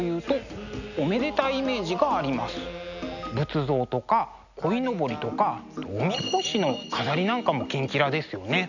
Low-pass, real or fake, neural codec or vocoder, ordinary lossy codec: 7.2 kHz; real; none; none